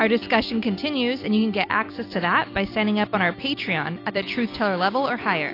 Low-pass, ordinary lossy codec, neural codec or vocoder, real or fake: 5.4 kHz; AAC, 32 kbps; none; real